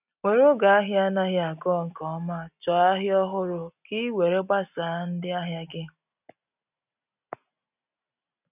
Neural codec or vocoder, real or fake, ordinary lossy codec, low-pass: none; real; none; 3.6 kHz